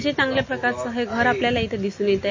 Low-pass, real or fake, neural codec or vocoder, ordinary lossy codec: 7.2 kHz; real; none; MP3, 32 kbps